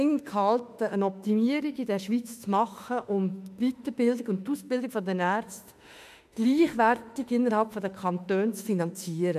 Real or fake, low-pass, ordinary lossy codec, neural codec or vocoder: fake; 14.4 kHz; none; autoencoder, 48 kHz, 32 numbers a frame, DAC-VAE, trained on Japanese speech